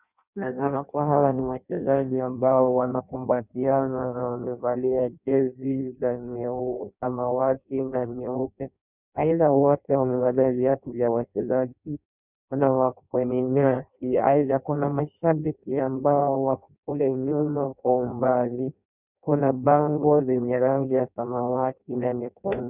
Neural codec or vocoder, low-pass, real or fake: codec, 16 kHz in and 24 kHz out, 0.6 kbps, FireRedTTS-2 codec; 3.6 kHz; fake